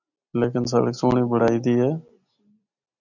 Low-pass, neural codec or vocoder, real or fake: 7.2 kHz; none; real